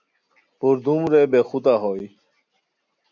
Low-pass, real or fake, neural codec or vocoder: 7.2 kHz; real; none